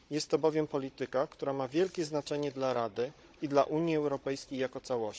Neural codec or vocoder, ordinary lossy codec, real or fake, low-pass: codec, 16 kHz, 16 kbps, FunCodec, trained on Chinese and English, 50 frames a second; none; fake; none